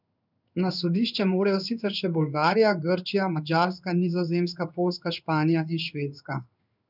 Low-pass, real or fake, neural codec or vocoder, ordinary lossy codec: 5.4 kHz; fake; codec, 16 kHz in and 24 kHz out, 1 kbps, XY-Tokenizer; none